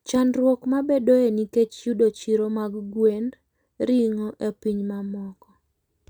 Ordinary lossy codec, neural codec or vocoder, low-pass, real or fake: none; none; 19.8 kHz; real